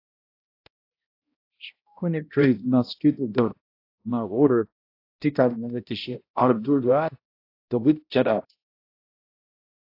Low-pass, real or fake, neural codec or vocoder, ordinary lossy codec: 5.4 kHz; fake; codec, 16 kHz, 0.5 kbps, X-Codec, HuBERT features, trained on balanced general audio; AAC, 32 kbps